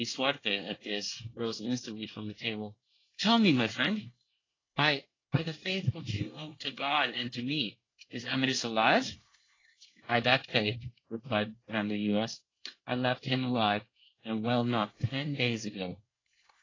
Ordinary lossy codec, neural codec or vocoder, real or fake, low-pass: AAC, 32 kbps; codec, 24 kHz, 1 kbps, SNAC; fake; 7.2 kHz